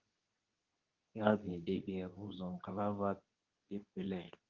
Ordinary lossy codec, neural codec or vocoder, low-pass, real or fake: Opus, 32 kbps; codec, 24 kHz, 0.9 kbps, WavTokenizer, medium speech release version 2; 7.2 kHz; fake